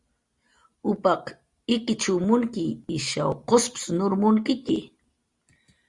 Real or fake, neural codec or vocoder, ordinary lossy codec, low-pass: real; none; Opus, 64 kbps; 10.8 kHz